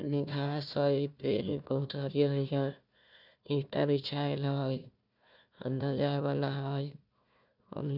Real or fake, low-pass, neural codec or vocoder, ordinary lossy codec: fake; 5.4 kHz; codec, 16 kHz, 1 kbps, FunCodec, trained on Chinese and English, 50 frames a second; none